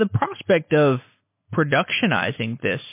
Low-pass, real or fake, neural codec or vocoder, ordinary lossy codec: 3.6 kHz; real; none; MP3, 24 kbps